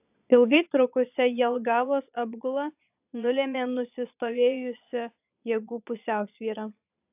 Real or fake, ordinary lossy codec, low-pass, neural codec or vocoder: fake; AAC, 32 kbps; 3.6 kHz; vocoder, 22.05 kHz, 80 mel bands, Vocos